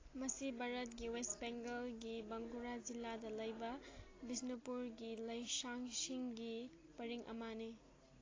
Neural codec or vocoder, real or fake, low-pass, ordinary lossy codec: none; real; 7.2 kHz; AAC, 32 kbps